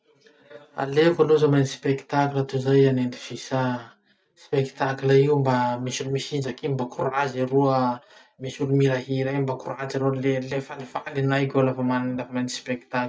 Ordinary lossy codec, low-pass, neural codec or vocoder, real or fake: none; none; none; real